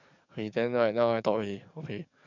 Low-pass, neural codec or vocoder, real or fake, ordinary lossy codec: 7.2 kHz; codec, 44.1 kHz, 7.8 kbps, DAC; fake; none